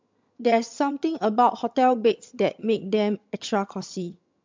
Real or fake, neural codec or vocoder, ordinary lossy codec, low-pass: fake; vocoder, 22.05 kHz, 80 mel bands, HiFi-GAN; MP3, 64 kbps; 7.2 kHz